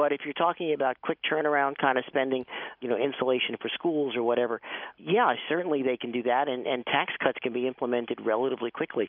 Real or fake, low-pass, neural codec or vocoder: real; 5.4 kHz; none